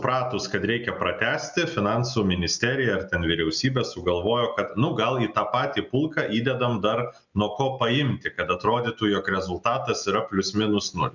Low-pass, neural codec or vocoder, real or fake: 7.2 kHz; none; real